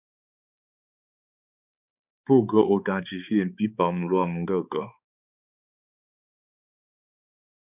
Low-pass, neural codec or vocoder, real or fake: 3.6 kHz; codec, 16 kHz, 4 kbps, X-Codec, HuBERT features, trained on balanced general audio; fake